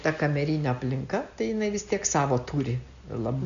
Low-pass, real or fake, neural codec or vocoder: 7.2 kHz; real; none